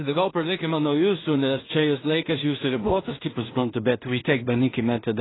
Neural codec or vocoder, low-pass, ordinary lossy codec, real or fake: codec, 16 kHz in and 24 kHz out, 0.4 kbps, LongCat-Audio-Codec, two codebook decoder; 7.2 kHz; AAC, 16 kbps; fake